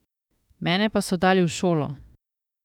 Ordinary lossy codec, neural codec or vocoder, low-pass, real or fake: none; autoencoder, 48 kHz, 32 numbers a frame, DAC-VAE, trained on Japanese speech; 19.8 kHz; fake